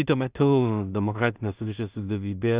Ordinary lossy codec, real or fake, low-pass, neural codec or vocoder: Opus, 64 kbps; fake; 3.6 kHz; codec, 16 kHz in and 24 kHz out, 0.4 kbps, LongCat-Audio-Codec, two codebook decoder